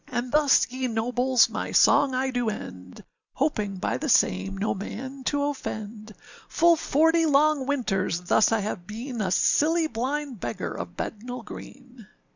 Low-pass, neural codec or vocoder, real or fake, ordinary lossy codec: 7.2 kHz; none; real; Opus, 64 kbps